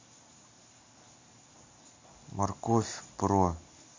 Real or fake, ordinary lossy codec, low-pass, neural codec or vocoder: real; MP3, 48 kbps; 7.2 kHz; none